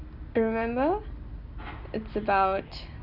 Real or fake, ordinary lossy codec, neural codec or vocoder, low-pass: real; none; none; 5.4 kHz